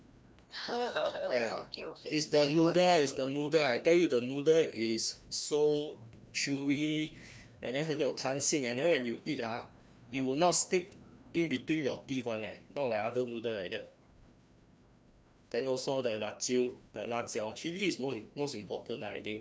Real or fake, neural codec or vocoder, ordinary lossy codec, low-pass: fake; codec, 16 kHz, 1 kbps, FreqCodec, larger model; none; none